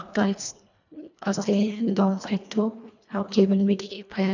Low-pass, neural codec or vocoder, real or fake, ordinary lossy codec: 7.2 kHz; codec, 24 kHz, 1.5 kbps, HILCodec; fake; none